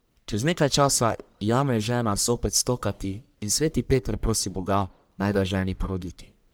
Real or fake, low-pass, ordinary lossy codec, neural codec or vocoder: fake; none; none; codec, 44.1 kHz, 1.7 kbps, Pupu-Codec